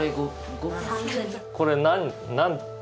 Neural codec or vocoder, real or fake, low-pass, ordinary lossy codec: none; real; none; none